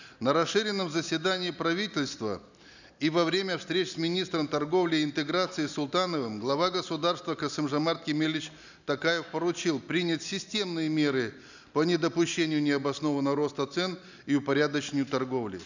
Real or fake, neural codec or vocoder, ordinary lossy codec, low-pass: real; none; none; 7.2 kHz